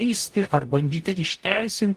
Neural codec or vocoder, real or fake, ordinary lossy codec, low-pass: codec, 44.1 kHz, 0.9 kbps, DAC; fake; Opus, 24 kbps; 14.4 kHz